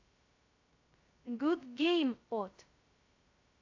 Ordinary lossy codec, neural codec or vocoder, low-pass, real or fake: AAC, 48 kbps; codec, 16 kHz, 0.2 kbps, FocalCodec; 7.2 kHz; fake